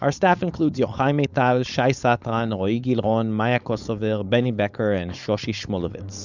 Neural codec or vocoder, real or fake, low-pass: codec, 16 kHz, 4.8 kbps, FACodec; fake; 7.2 kHz